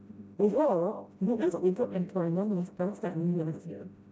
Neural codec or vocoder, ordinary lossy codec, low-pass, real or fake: codec, 16 kHz, 0.5 kbps, FreqCodec, smaller model; none; none; fake